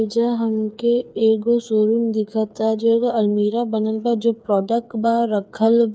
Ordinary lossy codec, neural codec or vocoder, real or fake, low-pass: none; codec, 16 kHz, 8 kbps, FreqCodec, smaller model; fake; none